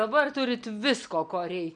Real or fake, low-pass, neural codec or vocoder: real; 9.9 kHz; none